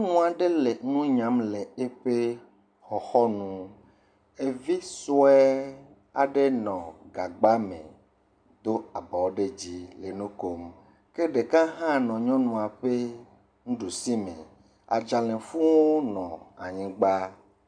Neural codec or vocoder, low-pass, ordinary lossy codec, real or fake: none; 9.9 kHz; MP3, 96 kbps; real